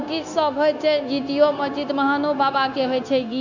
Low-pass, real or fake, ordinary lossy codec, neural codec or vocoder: 7.2 kHz; fake; none; codec, 16 kHz, 0.9 kbps, LongCat-Audio-Codec